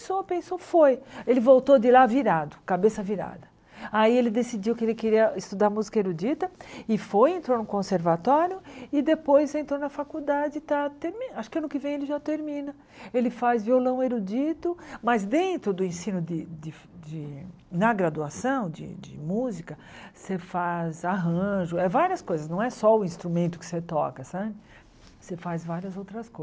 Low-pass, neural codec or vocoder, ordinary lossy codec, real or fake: none; none; none; real